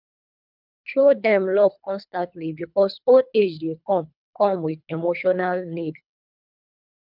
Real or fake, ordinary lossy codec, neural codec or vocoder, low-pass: fake; none; codec, 24 kHz, 3 kbps, HILCodec; 5.4 kHz